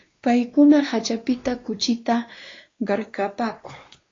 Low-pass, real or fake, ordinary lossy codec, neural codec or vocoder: 7.2 kHz; fake; AAC, 32 kbps; codec, 16 kHz, 2 kbps, X-Codec, WavLM features, trained on Multilingual LibriSpeech